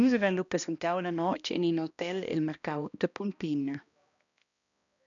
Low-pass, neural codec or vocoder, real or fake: 7.2 kHz; codec, 16 kHz, 1 kbps, X-Codec, HuBERT features, trained on balanced general audio; fake